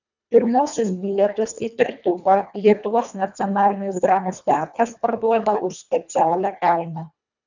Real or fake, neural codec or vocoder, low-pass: fake; codec, 24 kHz, 1.5 kbps, HILCodec; 7.2 kHz